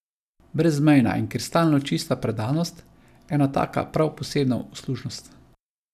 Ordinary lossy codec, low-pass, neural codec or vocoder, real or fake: none; 14.4 kHz; none; real